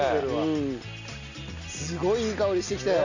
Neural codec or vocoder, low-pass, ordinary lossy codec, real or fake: none; 7.2 kHz; none; real